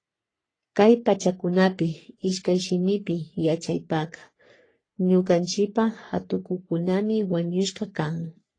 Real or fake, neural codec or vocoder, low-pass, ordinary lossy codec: fake; codec, 44.1 kHz, 3.4 kbps, Pupu-Codec; 9.9 kHz; AAC, 32 kbps